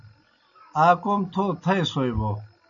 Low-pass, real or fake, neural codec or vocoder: 7.2 kHz; real; none